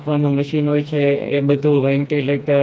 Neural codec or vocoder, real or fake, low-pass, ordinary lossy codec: codec, 16 kHz, 2 kbps, FreqCodec, smaller model; fake; none; none